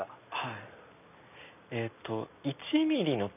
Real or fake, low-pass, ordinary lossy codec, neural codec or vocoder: real; 3.6 kHz; none; none